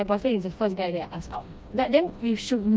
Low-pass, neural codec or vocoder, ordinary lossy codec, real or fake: none; codec, 16 kHz, 1 kbps, FreqCodec, smaller model; none; fake